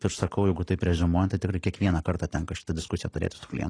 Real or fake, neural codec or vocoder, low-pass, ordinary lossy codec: real; none; 9.9 kHz; AAC, 32 kbps